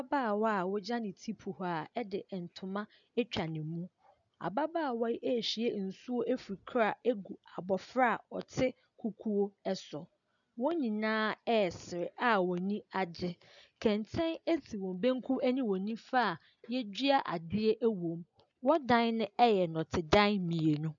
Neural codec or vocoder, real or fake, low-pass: none; real; 7.2 kHz